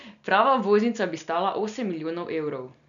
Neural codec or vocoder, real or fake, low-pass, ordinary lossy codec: none; real; 7.2 kHz; none